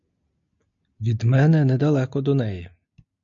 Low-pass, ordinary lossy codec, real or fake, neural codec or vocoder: 7.2 kHz; AAC, 64 kbps; real; none